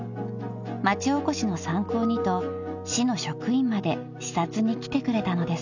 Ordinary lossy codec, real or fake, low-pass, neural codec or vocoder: none; real; 7.2 kHz; none